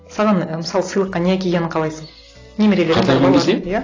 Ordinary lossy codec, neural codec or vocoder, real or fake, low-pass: AAC, 32 kbps; none; real; 7.2 kHz